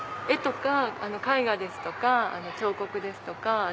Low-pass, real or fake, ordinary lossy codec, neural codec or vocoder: none; real; none; none